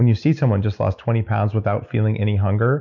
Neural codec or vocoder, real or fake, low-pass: none; real; 7.2 kHz